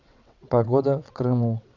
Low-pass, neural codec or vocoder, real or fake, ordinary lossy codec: 7.2 kHz; vocoder, 22.05 kHz, 80 mel bands, WaveNeXt; fake; none